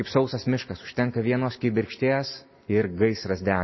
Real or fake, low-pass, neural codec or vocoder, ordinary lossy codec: real; 7.2 kHz; none; MP3, 24 kbps